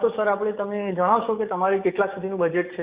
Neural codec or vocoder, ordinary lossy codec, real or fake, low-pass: codec, 44.1 kHz, 7.8 kbps, DAC; Opus, 64 kbps; fake; 3.6 kHz